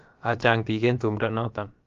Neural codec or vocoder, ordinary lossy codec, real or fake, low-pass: codec, 16 kHz, about 1 kbps, DyCAST, with the encoder's durations; Opus, 32 kbps; fake; 7.2 kHz